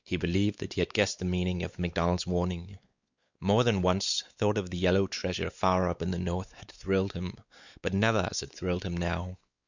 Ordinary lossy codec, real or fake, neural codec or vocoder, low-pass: Opus, 64 kbps; fake; codec, 16 kHz, 4 kbps, X-Codec, WavLM features, trained on Multilingual LibriSpeech; 7.2 kHz